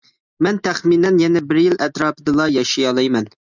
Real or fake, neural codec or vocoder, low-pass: real; none; 7.2 kHz